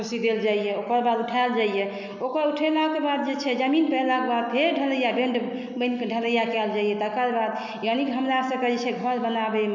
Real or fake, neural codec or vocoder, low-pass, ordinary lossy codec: real; none; 7.2 kHz; none